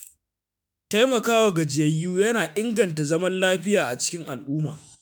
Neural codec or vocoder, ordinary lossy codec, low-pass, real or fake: autoencoder, 48 kHz, 32 numbers a frame, DAC-VAE, trained on Japanese speech; none; none; fake